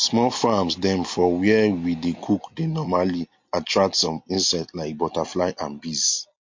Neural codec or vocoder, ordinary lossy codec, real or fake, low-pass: none; MP3, 48 kbps; real; 7.2 kHz